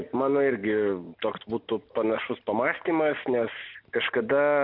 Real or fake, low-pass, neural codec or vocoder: real; 5.4 kHz; none